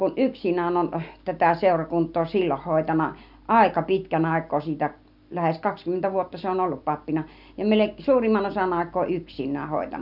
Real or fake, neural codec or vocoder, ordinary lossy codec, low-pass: real; none; none; 5.4 kHz